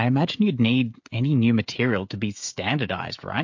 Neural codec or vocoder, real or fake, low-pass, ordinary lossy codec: codec, 16 kHz, 16 kbps, FreqCodec, smaller model; fake; 7.2 kHz; MP3, 48 kbps